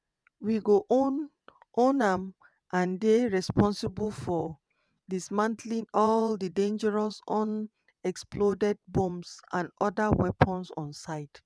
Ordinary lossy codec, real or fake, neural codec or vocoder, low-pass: none; fake; vocoder, 22.05 kHz, 80 mel bands, WaveNeXt; none